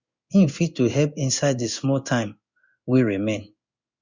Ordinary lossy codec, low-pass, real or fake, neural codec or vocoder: Opus, 64 kbps; 7.2 kHz; fake; codec, 16 kHz in and 24 kHz out, 1 kbps, XY-Tokenizer